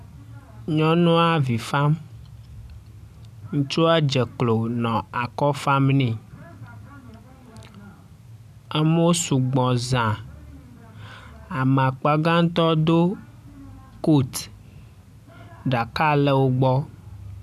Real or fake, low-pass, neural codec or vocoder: real; 14.4 kHz; none